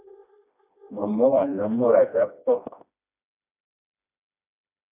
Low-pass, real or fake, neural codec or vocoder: 3.6 kHz; fake; codec, 16 kHz, 1 kbps, FreqCodec, smaller model